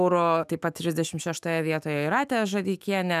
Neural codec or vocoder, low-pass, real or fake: autoencoder, 48 kHz, 128 numbers a frame, DAC-VAE, trained on Japanese speech; 14.4 kHz; fake